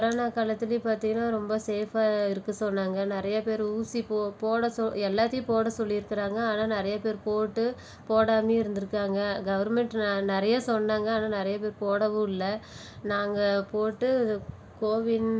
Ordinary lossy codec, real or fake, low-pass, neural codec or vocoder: none; real; none; none